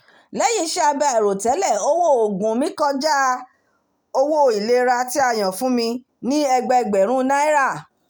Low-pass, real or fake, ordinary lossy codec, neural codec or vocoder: none; real; none; none